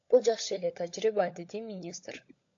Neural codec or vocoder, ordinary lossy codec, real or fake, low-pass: codec, 16 kHz, 16 kbps, FunCodec, trained on LibriTTS, 50 frames a second; AAC, 48 kbps; fake; 7.2 kHz